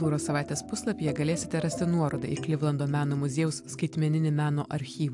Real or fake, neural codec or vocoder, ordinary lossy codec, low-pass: real; none; AAC, 64 kbps; 10.8 kHz